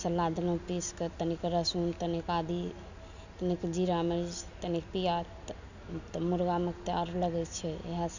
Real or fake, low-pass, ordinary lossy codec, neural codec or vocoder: real; 7.2 kHz; none; none